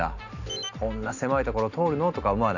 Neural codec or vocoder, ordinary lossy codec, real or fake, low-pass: none; none; real; 7.2 kHz